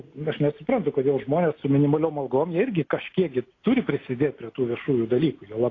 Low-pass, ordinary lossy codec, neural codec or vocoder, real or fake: 7.2 kHz; AAC, 32 kbps; none; real